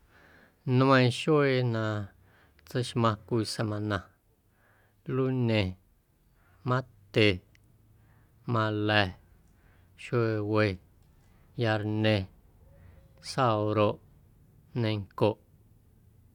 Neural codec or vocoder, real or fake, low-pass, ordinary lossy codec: none; real; 19.8 kHz; none